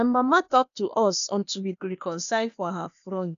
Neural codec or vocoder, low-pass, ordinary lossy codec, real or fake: codec, 16 kHz, 0.8 kbps, ZipCodec; 7.2 kHz; none; fake